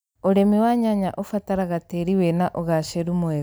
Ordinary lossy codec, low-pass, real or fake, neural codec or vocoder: none; none; real; none